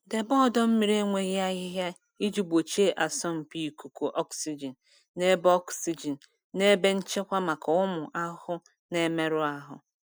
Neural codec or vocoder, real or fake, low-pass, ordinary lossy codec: none; real; none; none